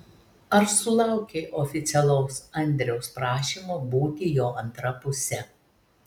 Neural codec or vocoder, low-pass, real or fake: none; 19.8 kHz; real